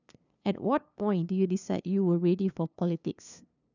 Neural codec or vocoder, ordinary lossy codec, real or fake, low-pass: codec, 16 kHz, 2 kbps, FunCodec, trained on LibriTTS, 25 frames a second; none; fake; 7.2 kHz